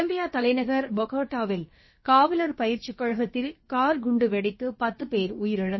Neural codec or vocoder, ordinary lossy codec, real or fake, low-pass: codec, 16 kHz, about 1 kbps, DyCAST, with the encoder's durations; MP3, 24 kbps; fake; 7.2 kHz